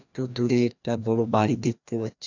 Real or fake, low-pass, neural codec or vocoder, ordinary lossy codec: fake; 7.2 kHz; codec, 16 kHz, 1 kbps, FreqCodec, larger model; none